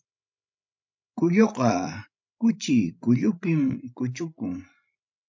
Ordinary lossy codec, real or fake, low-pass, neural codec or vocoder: MP3, 48 kbps; fake; 7.2 kHz; codec, 16 kHz, 16 kbps, FreqCodec, larger model